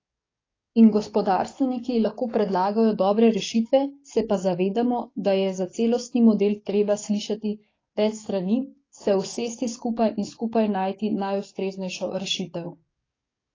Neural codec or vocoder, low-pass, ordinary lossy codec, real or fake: codec, 44.1 kHz, 7.8 kbps, DAC; 7.2 kHz; AAC, 32 kbps; fake